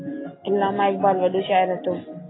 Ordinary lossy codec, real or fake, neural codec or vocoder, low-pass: AAC, 16 kbps; real; none; 7.2 kHz